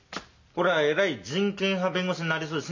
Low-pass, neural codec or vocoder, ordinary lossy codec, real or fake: 7.2 kHz; none; MP3, 48 kbps; real